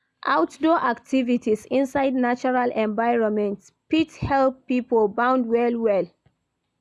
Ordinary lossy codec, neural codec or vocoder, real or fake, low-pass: none; none; real; none